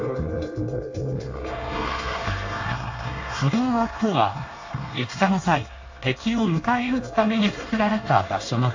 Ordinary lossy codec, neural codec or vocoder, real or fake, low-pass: AAC, 48 kbps; codec, 24 kHz, 1 kbps, SNAC; fake; 7.2 kHz